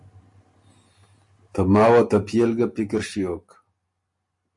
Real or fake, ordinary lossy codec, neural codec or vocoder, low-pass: real; MP3, 48 kbps; none; 10.8 kHz